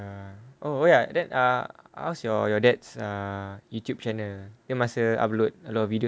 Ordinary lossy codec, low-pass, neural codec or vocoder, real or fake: none; none; none; real